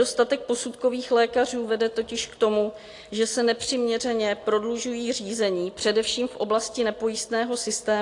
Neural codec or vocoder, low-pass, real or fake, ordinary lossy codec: none; 10.8 kHz; real; AAC, 48 kbps